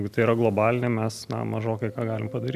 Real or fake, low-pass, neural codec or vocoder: real; 14.4 kHz; none